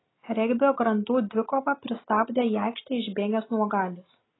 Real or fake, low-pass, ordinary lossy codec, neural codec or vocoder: real; 7.2 kHz; AAC, 16 kbps; none